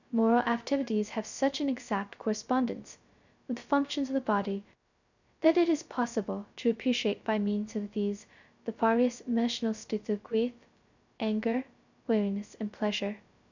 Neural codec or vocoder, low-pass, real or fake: codec, 16 kHz, 0.2 kbps, FocalCodec; 7.2 kHz; fake